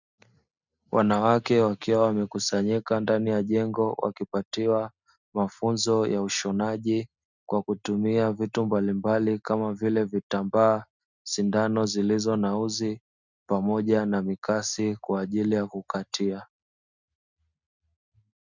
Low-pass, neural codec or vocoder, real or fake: 7.2 kHz; none; real